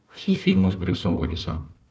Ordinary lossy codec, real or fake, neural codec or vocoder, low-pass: none; fake; codec, 16 kHz, 1 kbps, FunCodec, trained on Chinese and English, 50 frames a second; none